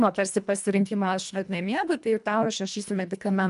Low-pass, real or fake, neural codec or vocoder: 10.8 kHz; fake; codec, 24 kHz, 1.5 kbps, HILCodec